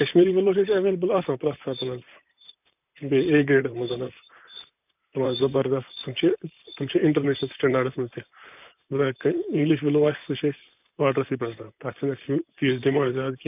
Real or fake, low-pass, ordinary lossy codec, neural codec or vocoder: fake; 3.6 kHz; none; vocoder, 44.1 kHz, 128 mel bands, Pupu-Vocoder